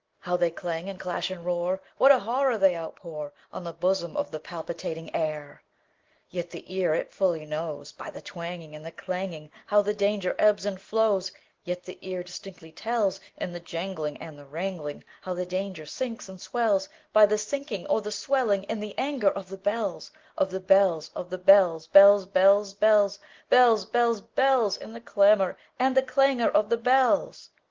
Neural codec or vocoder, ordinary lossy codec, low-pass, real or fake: none; Opus, 16 kbps; 7.2 kHz; real